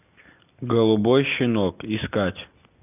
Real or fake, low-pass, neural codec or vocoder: real; 3.6 kHz; none